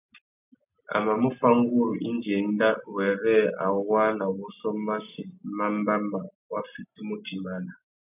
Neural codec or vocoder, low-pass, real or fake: none; 3.6 kHz; real